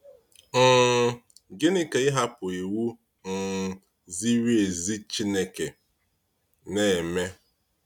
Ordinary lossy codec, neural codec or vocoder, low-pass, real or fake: none; none; 19.8 kHz; real